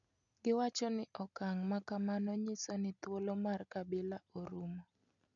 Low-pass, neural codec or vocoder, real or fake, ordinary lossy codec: 7.2 kHz; none; real; none